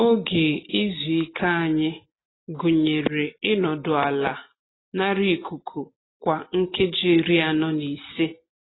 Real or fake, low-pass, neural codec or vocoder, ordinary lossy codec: real; 7.2 kHz; none; AAC, 16 kbps